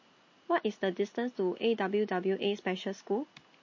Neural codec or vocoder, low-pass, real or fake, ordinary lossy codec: none; 7.2 kHz; real; MP3, 32 kbps